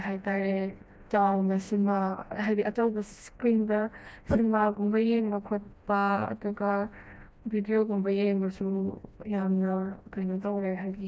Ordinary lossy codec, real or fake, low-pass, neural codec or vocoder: none; fake; none; codec, 16 kHz, 1 kbps, FreqCodec, smaller model